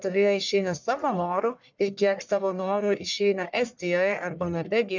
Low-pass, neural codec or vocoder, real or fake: 7.2 kHz; codec, 44.1 kHz, 1.7 kbps, Pupu-Codec; fake